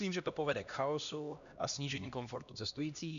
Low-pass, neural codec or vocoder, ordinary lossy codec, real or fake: 7.2 kHz; codec, 16 kHz, 1 kbps, X-Codec, HuBERT features, trained on LibriSpeech; MP3, 96 kbps; fake